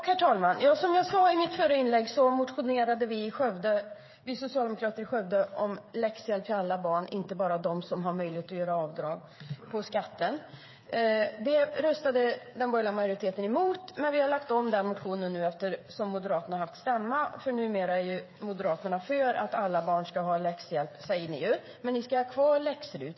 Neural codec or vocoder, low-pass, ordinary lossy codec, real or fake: codec, 16 kHz, 8 kbps, FreqCodec, smaller model; 7.2 kHz; MP3, 24 kbps; fake